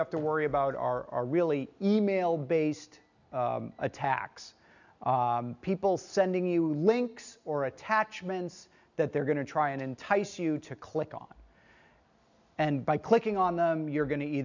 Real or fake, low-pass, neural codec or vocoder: real; 7.2 kHz; none